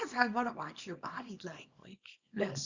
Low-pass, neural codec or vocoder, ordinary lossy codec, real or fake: 7.2 kHz; codec, 24 kHz, 0.9 kbps, WavTokenizer, small release; Opus, 64 kbps; fake